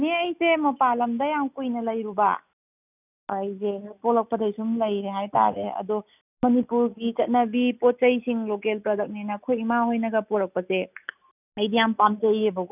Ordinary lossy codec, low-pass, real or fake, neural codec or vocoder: none; 3.6 kHz; real; none